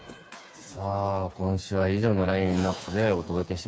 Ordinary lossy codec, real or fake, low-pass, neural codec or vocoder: none; fake; none; codec, 16 kHz, 4 kbps, FreqCodec, smaller model